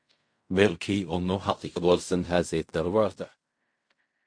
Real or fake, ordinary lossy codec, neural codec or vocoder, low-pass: fake; MP3, 48 kbps; codec, 16 kHz in and 24 kHz out, 0.4 kbps, LongCat-Audio-Codec, fine tuned four codebook decoder; 9.9 kHz